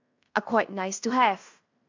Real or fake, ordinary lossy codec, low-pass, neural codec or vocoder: fake; AAC, 48 kbps; 7.2 kHz; codec, 16 kHz in and 24 kHz out, 0.9 kbps, LongCat-Audio-Codec, fine tuned four codebook decoder